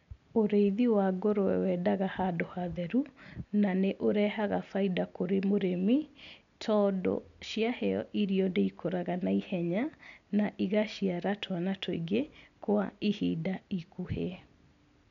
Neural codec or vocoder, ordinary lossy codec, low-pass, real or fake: none; none; 7.2 kHz; real